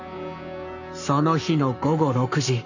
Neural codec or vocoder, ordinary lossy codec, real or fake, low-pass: codec, 44.1 kHz, 7.8 kbps, Pupu-Codec; none; fake; 7.2 kHz